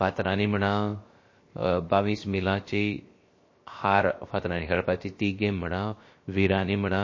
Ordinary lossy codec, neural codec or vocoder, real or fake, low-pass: MP3, 32 kbps; codec, 16 kHz, 0.7 kbps, FocalCodec; fake; 7.2 kHz